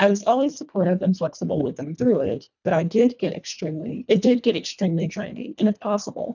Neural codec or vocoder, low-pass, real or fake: codec, 24 kHz, 1.5 kbps, HILCodec; 7.2 kHz; fake